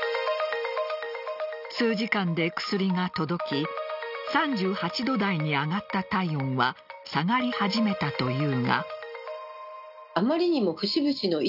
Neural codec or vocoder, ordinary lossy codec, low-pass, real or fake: none; none; 5.4 kHz; real